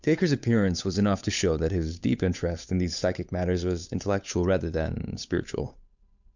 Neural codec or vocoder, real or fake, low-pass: codec, 16 kHz, 8 kbps, FunCodec, trained on Chinese and English, 25 frames a second; fake; 7.2 kHz